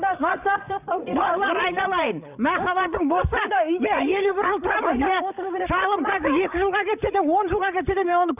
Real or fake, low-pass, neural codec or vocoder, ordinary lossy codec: fake; 3.6 kHz; codec, 16 kHz, 8 kbps, FreqCodec, larger model; none